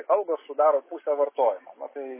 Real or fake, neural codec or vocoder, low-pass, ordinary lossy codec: real; none; 3.6 kHz; MP3, 16 kbps